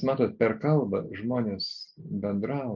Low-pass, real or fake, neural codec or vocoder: 7.2 kHz; real; none